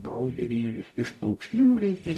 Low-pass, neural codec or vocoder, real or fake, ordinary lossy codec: 14.4 kHz; codec, 44.1 kHz, 0.9 kbps, DAC; fake; MP3, 96 kbps